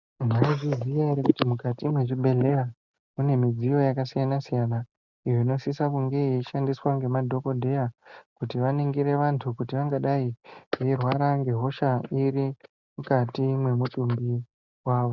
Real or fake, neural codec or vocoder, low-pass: real; none; 7.2 kHz